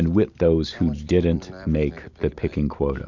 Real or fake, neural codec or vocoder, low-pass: real; none; 7.2 kHz